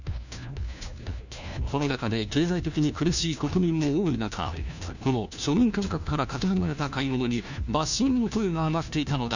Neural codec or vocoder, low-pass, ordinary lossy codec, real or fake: codec, 16 kHz, 1 kbps, FunCodec, trained on LibriTTS, 50 frames a second; 7.2 kHz; none; fake